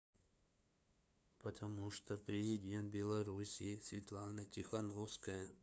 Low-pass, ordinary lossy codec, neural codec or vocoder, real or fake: none; none; codec, 16 kHz, 2 kbps, FunCodec, trained on LibriTTS, 25 frames a second; fake